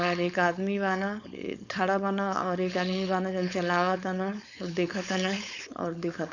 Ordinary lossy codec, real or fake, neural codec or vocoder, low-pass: none; fake; codec, 16 kHz, 4.8 kbps, FACodec; 7.2 kHz